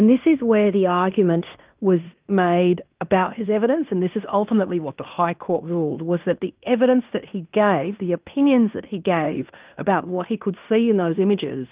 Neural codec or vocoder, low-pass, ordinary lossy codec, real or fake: codec, 16 kHz in and 24 kHz out, 0.9 kbps, LongCat-Audio-Codec, fine tuned four codebook decoder; 3.6 kHz; Opus, 32 kbps; fake